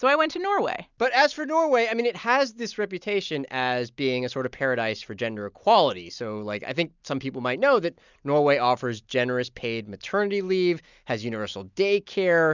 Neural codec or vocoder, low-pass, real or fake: none; 7.2 kHz; real